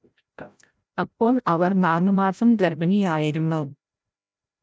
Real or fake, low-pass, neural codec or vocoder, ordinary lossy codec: fake; none; codec, 16 kHz, 0.5 kbps, FreqCodec, larger model; none